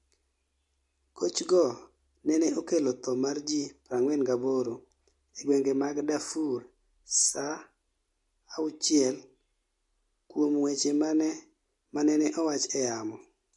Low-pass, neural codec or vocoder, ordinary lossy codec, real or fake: 10.8 kHz; none; MP3, 48 kbps; real